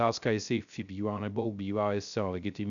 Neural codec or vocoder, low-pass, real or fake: codec, 16 kHz, 0.3 kbps, FocalCodec; 7.2 kHz; fake